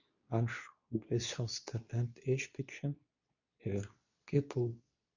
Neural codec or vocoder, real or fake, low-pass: codec, 24 kHz, 0.9 kbps, WavTokenizer, medium speech release version 2; fake; 7.2 kHz